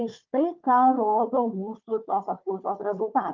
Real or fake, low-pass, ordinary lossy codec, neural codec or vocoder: fake; 7.2 kHz; Opus, 24 kbps; codec, 16 kHz, 4 kbps, FunCodec, trained on Chinese and English, 50 frames a second